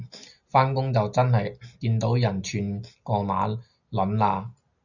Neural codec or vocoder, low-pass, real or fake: none; 7.2 kHz; real